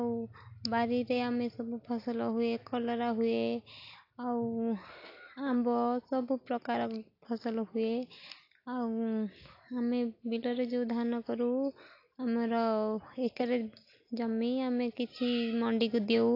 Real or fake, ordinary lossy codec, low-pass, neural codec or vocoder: real; AAC, 32 kbps; 5.4 kHz; none